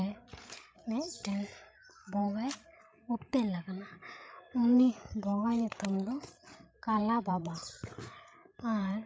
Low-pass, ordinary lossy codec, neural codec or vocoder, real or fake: none; none; codec, 16 kHz, 8 kbps, FreqCodec, larger model; fake